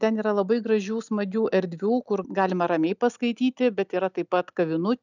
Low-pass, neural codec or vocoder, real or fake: 7.2 kHz; none; real